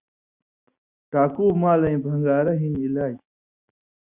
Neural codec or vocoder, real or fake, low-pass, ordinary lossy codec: none; real; 3.6 kHz; Opus, 64 kbps